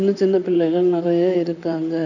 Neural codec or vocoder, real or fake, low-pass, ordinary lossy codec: vocoder, 44.1 kHz, 128 mel bands, Pupu-Vocoder; fake; 7.2 kHz; none